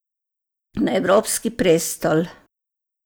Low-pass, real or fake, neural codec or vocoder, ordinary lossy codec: none; real; none; none